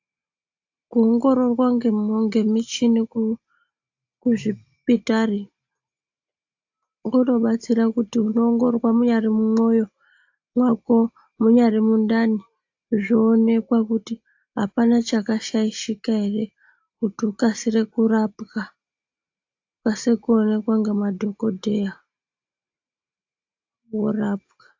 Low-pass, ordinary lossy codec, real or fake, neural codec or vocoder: 7.2 kHz; AAC, 48 kbps; real; none